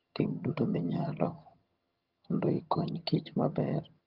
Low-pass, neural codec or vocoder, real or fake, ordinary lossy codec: 5.4 kHz; vocoder, 22.05 kHz, 80 mel bands, HiFi-GAN; fake; Opus, 32 kbps